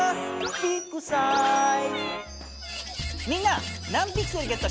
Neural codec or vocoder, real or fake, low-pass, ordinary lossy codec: none; real; none; none